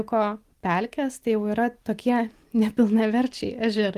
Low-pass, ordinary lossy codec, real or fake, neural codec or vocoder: 14.4 kHz; Opus, 24 kbps; real; none